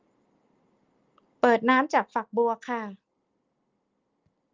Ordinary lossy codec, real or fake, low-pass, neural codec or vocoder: Opus, 32 kbps; real; 7.2 kHz; none